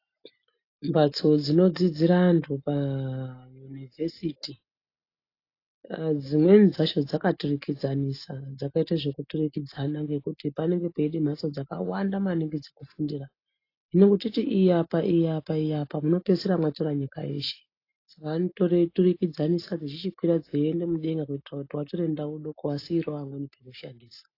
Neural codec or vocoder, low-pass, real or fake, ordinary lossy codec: none; 5.4 kHz; real; AAC, 32 kbps